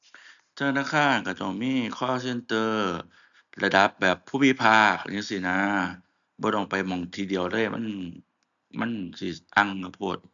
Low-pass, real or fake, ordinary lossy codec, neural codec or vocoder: 7.2 kHz; real; none; none